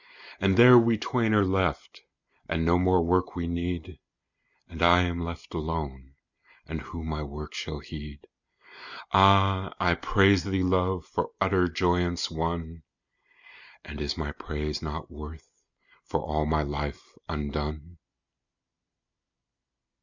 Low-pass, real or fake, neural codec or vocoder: 7.2 kHz; real; none